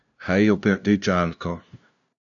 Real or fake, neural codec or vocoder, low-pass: fake; codec, 16 kHz, 0.5 kbps, FunCodec, trained on LibriTTS, 25 frames a second; 7.2 kHz